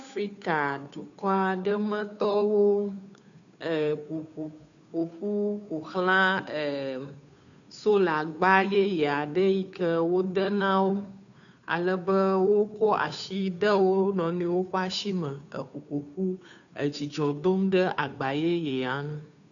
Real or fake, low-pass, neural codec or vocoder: fake; 7.2 kHz; codec, 16 kHz, 2 kbps, FunCodec, trained on Chinese and English, 25 frames a second